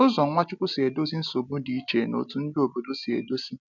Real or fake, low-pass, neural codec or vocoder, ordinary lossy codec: real; 7.2 kHz; none; none